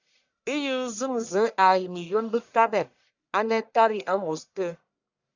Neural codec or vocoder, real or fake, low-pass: codec, 44.1 kHz, 1.7 kbps, Pupu-Codec; fake; 7.2 kHz